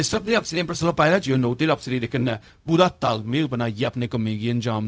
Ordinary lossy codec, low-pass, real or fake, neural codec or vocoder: none; none; fake; codec, 16 kHz, 0.4 kbps, LongCat-Audio-Codec